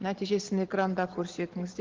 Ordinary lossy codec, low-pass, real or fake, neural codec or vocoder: Opus, 16 kbps; 7.2 kHz; real; none